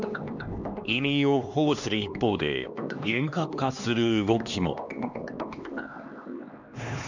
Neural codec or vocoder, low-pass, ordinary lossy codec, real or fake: codec, 16 kHz, 2 kbps, X-Codec, HuBERT features, trained on LibriSpeech; 7.2 kHz; none; fake